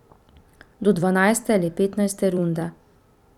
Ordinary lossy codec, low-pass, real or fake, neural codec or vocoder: none; 19.8 kHz; fake; vocoder, 44.1 kHz, 128 mel bands every 256 samples, BigVGAN v2